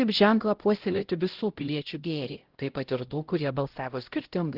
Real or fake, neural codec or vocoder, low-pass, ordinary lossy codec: fake; codec, 16 kHz, 0.5 kbps, X-Codec, HuBERT features, trained on LibriSpeech; 5.4 kHz; Opus, 16 kbps